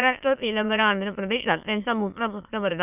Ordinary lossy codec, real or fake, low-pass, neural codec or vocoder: none; fake; 3.6 kHz; autoencoder, 22.05 kHz, a latent of 192 numbers a frame, VITS, trained on many speakers